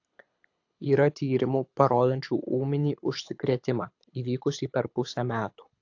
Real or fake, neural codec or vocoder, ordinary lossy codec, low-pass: fake; codec, 24 kHz, 6 kbps, HILCodec; AAC, 48 kbps; 7.2 kHz